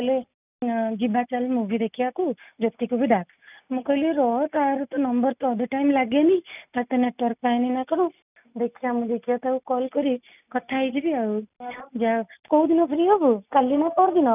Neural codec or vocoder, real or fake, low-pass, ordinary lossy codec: none; real; 3.6 kHz; none